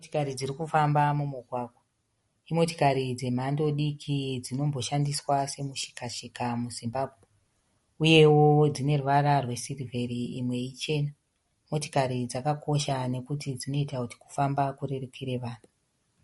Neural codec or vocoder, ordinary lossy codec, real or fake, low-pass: none; MP3, 48 kbps; real; 19.8 kHz